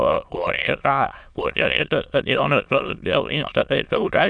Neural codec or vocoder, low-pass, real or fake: autoencoder, 22.05 kHz, a latent of 192 numbers a frame, VITS, trained on many speakers; 9.9 kHz; fake